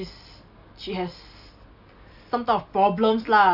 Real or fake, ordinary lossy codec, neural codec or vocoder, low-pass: real; none; none; 5.4 kHz